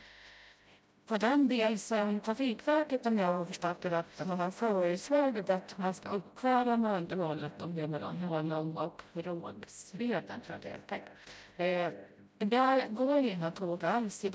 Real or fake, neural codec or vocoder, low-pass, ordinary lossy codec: fake; codec, 16 kHz, 0.5 kbps, FreqCodec, smaller model; none; none